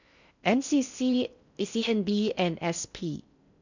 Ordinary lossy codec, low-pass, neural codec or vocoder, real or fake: none; 7.2 kHz; codec, 16 kHz in and 24 kHz out, 0.6 kbps, FocalCodec, streaming, 4096 codes; fake